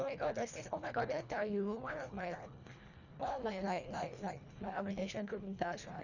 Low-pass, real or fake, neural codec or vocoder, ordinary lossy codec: 7.2 kHz; fake; codec, 24 kHz, 1.5 kbps, HILCodec; none